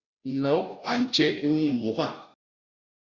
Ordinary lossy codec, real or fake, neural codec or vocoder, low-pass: Opus, 64 kbps; fake; codec, 16 kHz, 0.5 kbps, FunCodec, trained on Chinese and English, 25 frames a second; 7.2 kHz